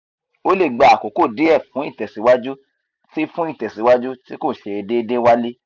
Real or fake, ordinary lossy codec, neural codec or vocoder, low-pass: real; none; none; 7.2 kHz